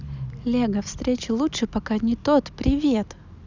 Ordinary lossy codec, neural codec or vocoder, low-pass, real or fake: none; none; 7.2 kHz; real